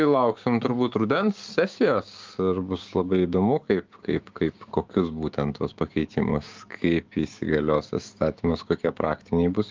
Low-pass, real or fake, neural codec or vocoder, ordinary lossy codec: 7.2 kHz; fake; codec, 16 kHz, 6 kbps, DAC; Opus, 32 kbps